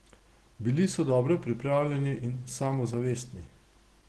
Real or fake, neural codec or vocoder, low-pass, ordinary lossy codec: real; none; 10.8 kHz; Opus, 16 kbps